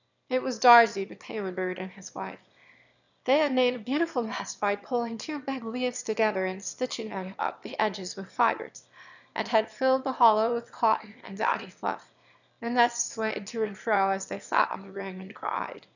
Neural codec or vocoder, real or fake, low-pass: autoencoder, 22.05 kHz, a latent of 192 numbers a frame, VITS, trained on one speaker; fake; 7.2 kHz